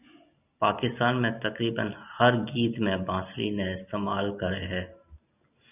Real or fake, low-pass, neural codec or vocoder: real; 3.6 kHz; none